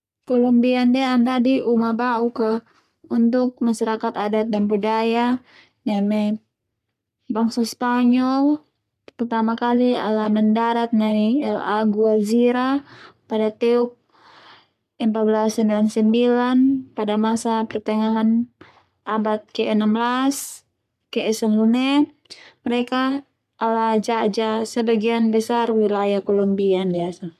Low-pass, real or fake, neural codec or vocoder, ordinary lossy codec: 14.4 kHz; fake; codec, 44.1 kHz, 3.4 kbps, Pupu-Codec; none